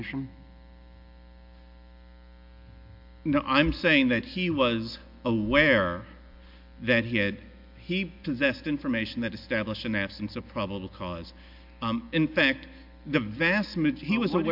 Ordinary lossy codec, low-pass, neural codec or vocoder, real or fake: MP3, 48 kbps; 5.4 kHz; none; real